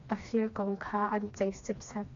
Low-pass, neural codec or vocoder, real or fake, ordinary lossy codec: 7.2 kHz; codec, 16 kHz, 2 kbps, FreqCodec, smaller model; fake; AAC, 48 kbps